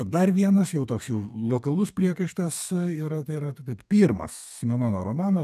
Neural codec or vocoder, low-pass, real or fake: codec, 32 kHz, 1.9 kbps, SNAC; 14.4 kHz; fake